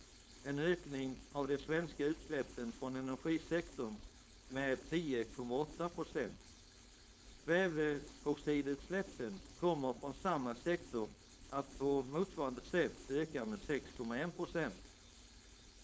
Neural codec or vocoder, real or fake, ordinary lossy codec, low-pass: codec, 16 kHz, 4.8 kbps, FACodec; fake; none; none